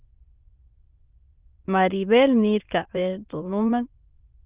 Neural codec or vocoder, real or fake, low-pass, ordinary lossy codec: autoencoder, 22.05 kHz, a latent of 192 numbers a frame, VITS, trained on many speakers; fake; 3.6 kHz; Opus, 32 kbps